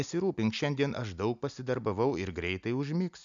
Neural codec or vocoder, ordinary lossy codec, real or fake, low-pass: none; MP3, 96 kbps; real; 7.2 kHz